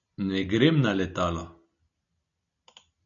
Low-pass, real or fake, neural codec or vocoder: 7.2 kHz; real; none